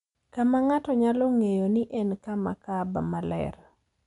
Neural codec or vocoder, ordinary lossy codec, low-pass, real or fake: none; none; 10.8 kHz; real